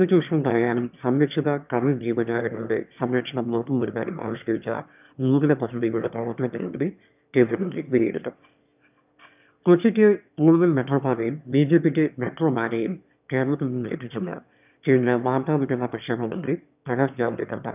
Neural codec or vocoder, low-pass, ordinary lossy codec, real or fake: autoencoder, 22.05 kHz, a latent of 192 numbers a frame, VITS, trained on one speaker; 3.6 kHz; none; fake